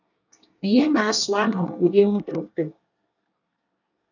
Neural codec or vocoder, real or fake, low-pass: codec, 24 kHz, 1 kbps, SNAC; fake; 7.2 kHz